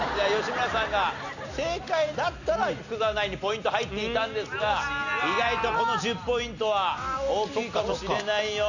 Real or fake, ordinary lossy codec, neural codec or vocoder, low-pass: real; none; none; 7.2 kHz